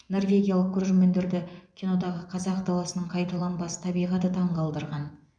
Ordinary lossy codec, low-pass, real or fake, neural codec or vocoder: none; 9.9 kHz; fake; vocoder, 24 kHz, 100 mel bands, Vocos